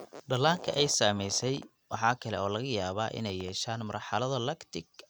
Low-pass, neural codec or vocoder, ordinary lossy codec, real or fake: none; none; none; real